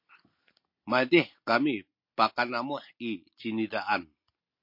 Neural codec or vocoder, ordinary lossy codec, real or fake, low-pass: none; MP3, 32 kbps; real; 5.4 kHz